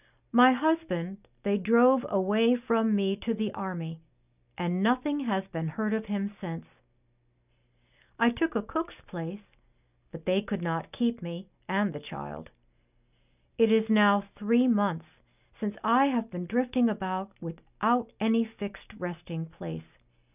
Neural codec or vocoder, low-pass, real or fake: none; 3.6 kHz; real